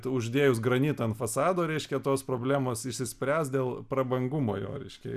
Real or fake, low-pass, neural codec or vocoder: real; 14.4 kHz; none